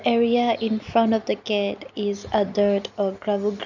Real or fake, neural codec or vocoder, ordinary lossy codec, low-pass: real; none; none; 7.2 kHz